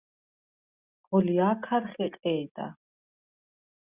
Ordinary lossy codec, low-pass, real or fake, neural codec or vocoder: Opus, 64 kbps; 3.6 kHz; real; none